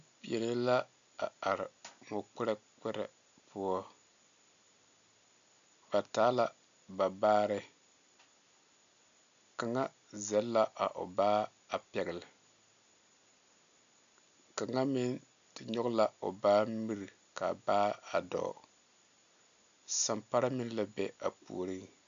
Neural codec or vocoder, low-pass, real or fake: none; 7.2 kHz; real